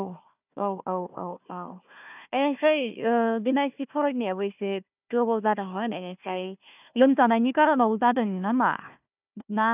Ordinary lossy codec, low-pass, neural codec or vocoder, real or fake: none; 3.6 kHz; codec, 16 kHz, 1 kbps, FunCodec, trained on Chinese and English, 50 frames a second; fake